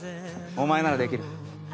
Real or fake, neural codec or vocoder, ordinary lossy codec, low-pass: real; none; none; none